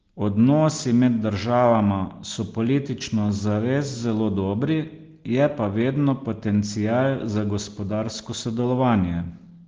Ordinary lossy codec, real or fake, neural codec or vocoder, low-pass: Opus, 16 kbps; real; none; 7.2 kHz